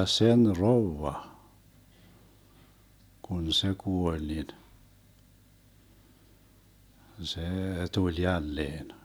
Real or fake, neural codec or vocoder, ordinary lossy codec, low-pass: real; none; none; none